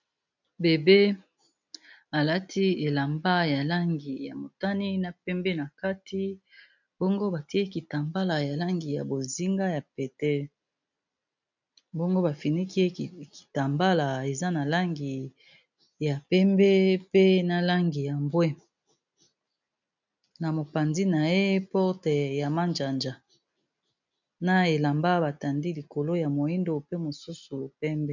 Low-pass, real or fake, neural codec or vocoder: 7.2 kHz; real; none